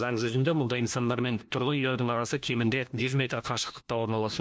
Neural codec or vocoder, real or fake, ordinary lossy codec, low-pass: codec, 16 kHz, 1 kbps, FunCodec, trained on LibriTTS, 50 frames a second; fake; none; none